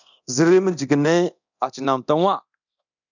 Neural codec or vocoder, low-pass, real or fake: codec, 24 kHz, 0.9 kbps, DualCodec; 7.2 kHz; fake